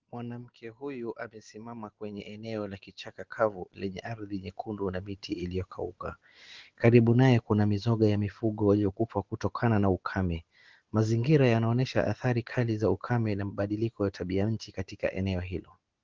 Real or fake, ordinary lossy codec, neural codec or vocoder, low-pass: real; Opus, 16 kbps; none; 7.2 kHz